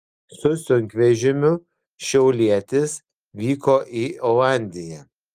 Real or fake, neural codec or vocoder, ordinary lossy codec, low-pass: real; none; Opus, 32 kbps; 14.4 kHz